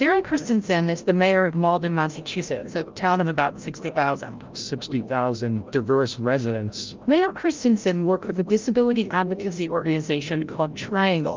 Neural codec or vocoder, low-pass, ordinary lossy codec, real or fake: codec, 16 kHz, 0.5 kbps, FreqCodec, larger model; 7.2 kHz; Opus, 24 kbps; fake